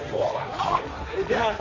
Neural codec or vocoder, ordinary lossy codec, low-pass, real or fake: codec, 16 kHz, 1.1 kbps, Voila-Tokenizer; none; 7.2 kHz; fake